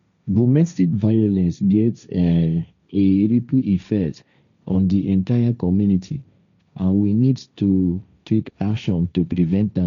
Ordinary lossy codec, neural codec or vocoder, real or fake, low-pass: none; codec, 16 kHz, 1.1 kbps, Voila-Tokenizer; fake; 7.2 kHz